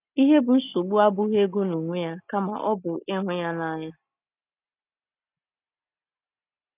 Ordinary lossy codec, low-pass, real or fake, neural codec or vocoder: none; 3.6 kHz; real; none